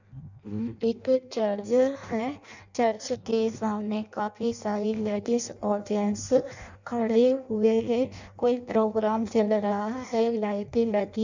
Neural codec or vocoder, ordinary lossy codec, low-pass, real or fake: codec, 16 kHz in and 24 kHz out, 0.6 kbps, FireRedTTS-2 codec; none; 7.2 kHz; fake